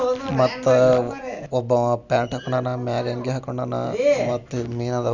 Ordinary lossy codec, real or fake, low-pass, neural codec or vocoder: none; real; 7.2 kHz; none